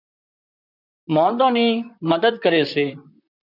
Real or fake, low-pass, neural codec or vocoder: fake; 5.4 kHz; vocoder, 44.1 kHz, 128 mel bands, Pupu-Vocoder